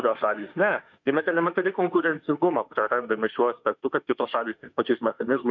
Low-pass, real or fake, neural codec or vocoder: 7.2 kHz; fake; autoencoder, 48 kHz, 32 numbers a frame, DAC-VAE, trained on Japanese speech